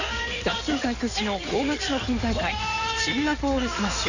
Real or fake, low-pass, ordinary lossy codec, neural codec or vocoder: fake; 7.2 kHz; none; codec, 16 kHz in and 24 kHz out, 2.2 kbps, FireRedTTS-2 codec